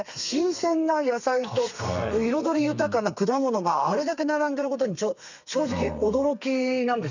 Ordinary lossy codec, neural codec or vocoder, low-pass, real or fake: none; codec, 32 kHz, 1.9 kbps, SNAC; 7.2 kHz; fake